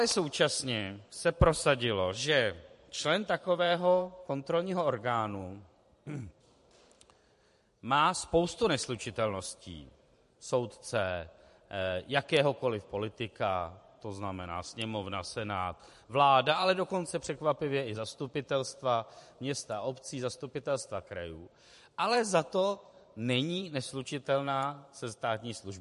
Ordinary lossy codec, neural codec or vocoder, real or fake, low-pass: MP3, 48 kbps; vocoder, 44.1 kHz, 128 mel bands every 512 samples, BigVGAN v2; fake; 14.4 kHz